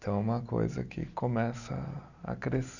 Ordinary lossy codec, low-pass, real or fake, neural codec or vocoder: none; 7.2 kHz; real; none